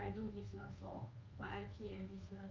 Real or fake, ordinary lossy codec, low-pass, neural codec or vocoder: fake; Opus, 24 kbps; 7.2 kHz; codec, 24 kHz, 1.2 kbps, DualCodec